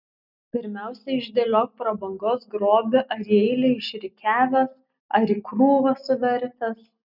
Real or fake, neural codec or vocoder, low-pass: real; none; 5.4 kHz